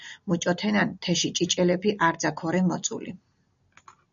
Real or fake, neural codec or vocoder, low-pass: real; none; 7.2 kHz